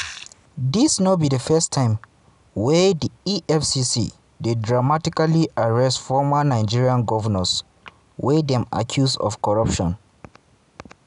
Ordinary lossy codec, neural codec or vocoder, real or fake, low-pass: none; none; real; 10.8 kHz